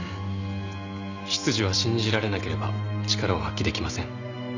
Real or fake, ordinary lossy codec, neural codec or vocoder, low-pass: real; Opus, 64 kbps; none; 7.2 kHz